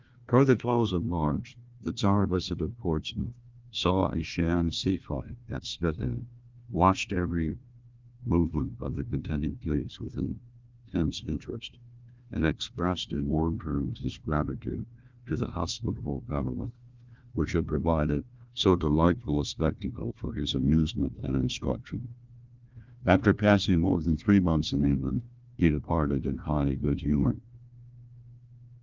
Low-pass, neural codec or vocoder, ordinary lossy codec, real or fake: 7.2 kHz; codec, 16 kHz, 1 kbps, FunCodec, trained on Chinese and English, 50 frames a second; Opus, 32 kbps; fake